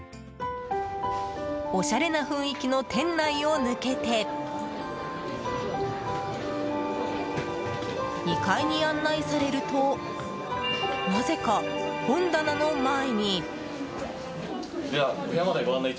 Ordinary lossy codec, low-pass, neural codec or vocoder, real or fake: none; none; none; real